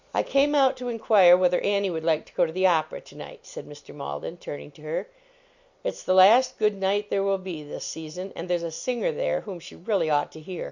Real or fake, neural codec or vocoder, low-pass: real; none; 7.2 kHz